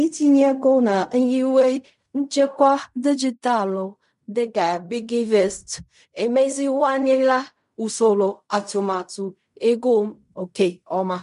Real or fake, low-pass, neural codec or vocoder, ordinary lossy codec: fake; 10.8 kHz; codec, 16 kHz in and 24 kHz out, 0.4 kbps, LongCat-Audio-Codec, fine tuned four codebook decoder; MP3, 64 kbps